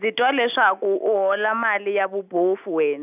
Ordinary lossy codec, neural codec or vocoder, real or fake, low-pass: none; none; real; 3.6 kHz